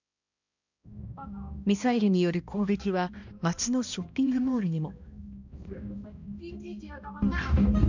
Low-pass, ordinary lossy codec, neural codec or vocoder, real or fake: 7.2 kHz; none; codec, 16 kHz, 1 kbps, X-Codec, HuBERT features, trained on balanced general audio; fake